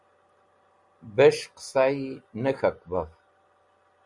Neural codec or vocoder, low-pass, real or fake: none; 10.8 kHz; real